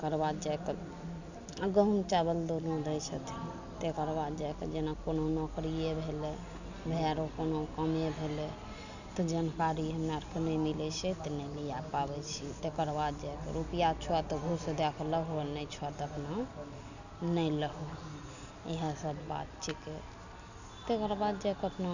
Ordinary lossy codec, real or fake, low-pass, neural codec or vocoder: none; real; 7.2 kHz; none